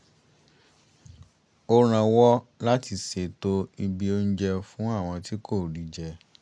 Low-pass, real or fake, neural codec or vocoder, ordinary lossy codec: 9.9 kHz; real; none; none